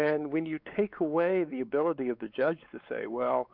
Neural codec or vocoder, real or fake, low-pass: codec, 16 kHz, 4 kbps, X-Codec, WavLM features, trained on Multilingual LibriSpeech; fake; 5.4 kHz